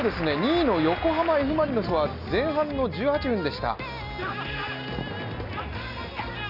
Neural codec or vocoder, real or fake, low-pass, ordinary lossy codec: none; real; 5.4 kHz; none